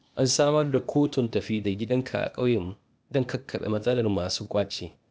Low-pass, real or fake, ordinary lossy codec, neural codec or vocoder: none; fake; none; codec, 16 kHz, 0.8 kbps, ZipCodec